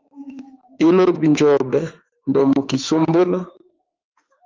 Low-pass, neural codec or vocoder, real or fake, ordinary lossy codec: 7.2 kHz; autoencoder, 48 kHz, 32 numbers a frame, DAC-VAE, trained on Japanese speech; fake; Opus, 32 kbps